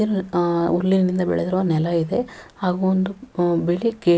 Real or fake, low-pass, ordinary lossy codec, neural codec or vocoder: real; none; none; none